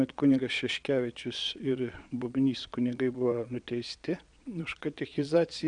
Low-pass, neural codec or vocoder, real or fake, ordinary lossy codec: 9.9 kHz; vocoder, 22.05 kHz, 80 mel bands, Vocos; fake; MP3, 96 kbps